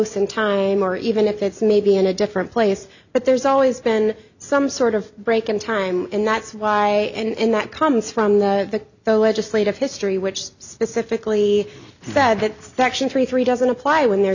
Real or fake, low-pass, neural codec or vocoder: real; 7.2 kHz; none